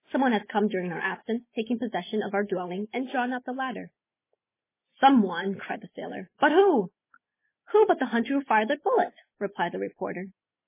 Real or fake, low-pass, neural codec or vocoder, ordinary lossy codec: real; 3.6 kHz; none; MP3, 16 kbps